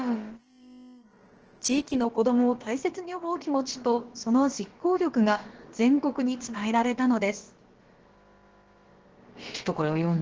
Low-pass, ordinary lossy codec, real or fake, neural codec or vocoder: 7.2 kHz; Opus, 16 kbps; fake; codec, 16 kHz, about 1 kbps, DyCAST, with the encoder's durations